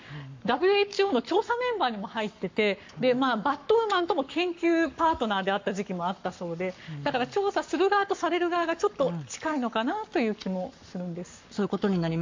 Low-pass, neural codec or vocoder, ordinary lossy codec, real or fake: 7.2 kHz; codec, 44.1 kHz, 7.8 kbps, Pupu-Codec; MP3, 64 kbps; fake